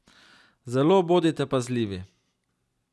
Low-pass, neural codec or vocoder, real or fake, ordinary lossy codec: none; none; real; none